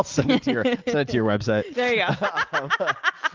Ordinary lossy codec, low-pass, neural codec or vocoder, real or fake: Opus, 24 kbps; 7.2 kHz; none; real